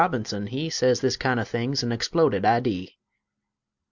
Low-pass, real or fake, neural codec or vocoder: 7.2 kHz; real; none